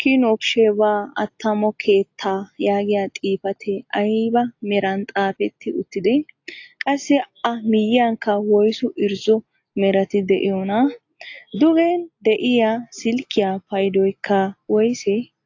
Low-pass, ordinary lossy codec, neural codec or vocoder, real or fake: 7.2 kHz; AAC, 48 kbps; none; real